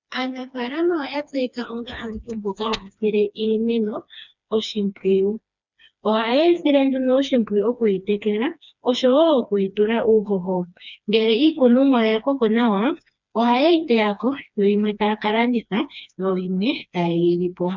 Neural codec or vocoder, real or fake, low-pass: codec, 16 kHz, 2 kbps, FreqCodec, smaller model; fake; 7.2 kHz